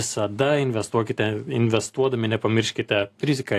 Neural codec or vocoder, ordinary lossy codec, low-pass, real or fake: none; AAC, 64 kbps; 14.4 kHz; real